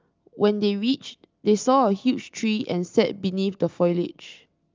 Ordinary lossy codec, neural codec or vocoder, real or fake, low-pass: Opus, 24 kbps; none; real; 7.2 kHz